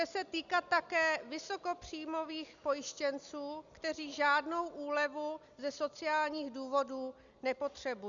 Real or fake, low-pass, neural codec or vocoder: real; 7.2 kHz; none